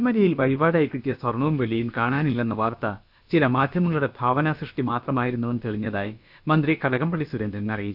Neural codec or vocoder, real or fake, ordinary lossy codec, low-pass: codec, 16 kHz, about 1 kbps, DyCAST, with the encoder's durations; fake; none; 5.4 kHz